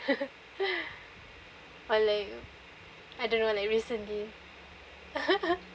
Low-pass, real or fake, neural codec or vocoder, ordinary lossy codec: none; real; none; none